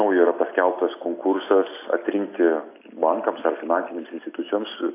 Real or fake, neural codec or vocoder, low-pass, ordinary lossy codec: real; none; 3.6 kHz; AAC, 24 kbps